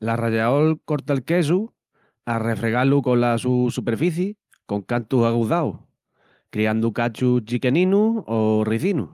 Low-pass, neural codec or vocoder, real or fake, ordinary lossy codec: 14.4 kHz; none; real; Opus, 32 kbps